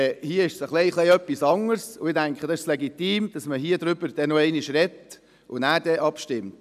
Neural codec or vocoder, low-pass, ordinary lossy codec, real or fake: none; 14.4 kHz; none; real